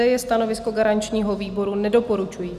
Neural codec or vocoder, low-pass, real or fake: none; 14.4 kHz; real